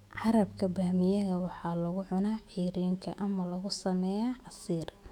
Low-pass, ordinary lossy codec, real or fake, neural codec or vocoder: 19.8 kHz; none; fake; autoencoder, 48 kHz, 128 numbers a frame, DAC-VAE, trained on Japanese speech